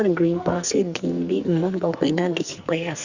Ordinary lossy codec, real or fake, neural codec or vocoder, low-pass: Opus, 64 kbps; fake; codec, 44.1 kHz, 2.6 kbps, SNAC; 7.2 kHz